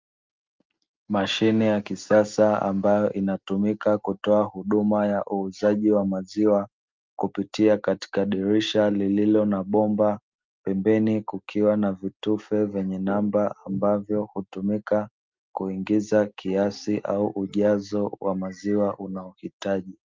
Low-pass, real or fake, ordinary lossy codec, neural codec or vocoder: 7.2 kHz; real; Opus, 24 kbps; none